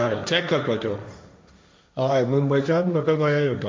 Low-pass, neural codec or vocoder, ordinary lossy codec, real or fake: none; codec, 16 kHz, 1.1 kbps, Voila-Tokenizer; none; fake